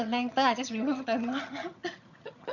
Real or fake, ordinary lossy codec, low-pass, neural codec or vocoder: fake; none; 7.2 kHz; vocoder, 22.05 kHz, 80 mel bands, HiFi-GAN